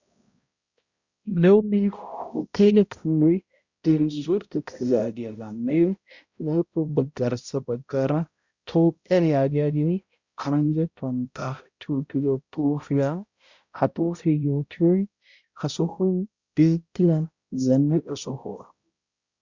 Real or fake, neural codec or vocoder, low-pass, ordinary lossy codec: fake; codec, 16 kHz, 0.5 kbps, X-Codec, HuBERT features, trained on balanced general audio; 7.2 kHz; Opus, 64 kbps